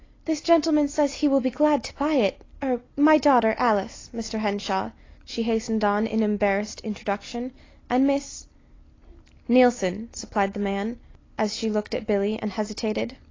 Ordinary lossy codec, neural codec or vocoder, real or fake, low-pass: AAC, 32 kbps; none; real; 7.2 kHz